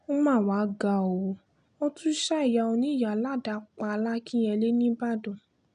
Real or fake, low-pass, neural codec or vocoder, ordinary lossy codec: real; 9.9 kHz; none; none